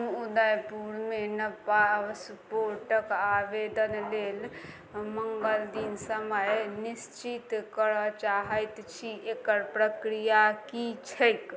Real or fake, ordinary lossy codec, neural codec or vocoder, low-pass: real; none; none; none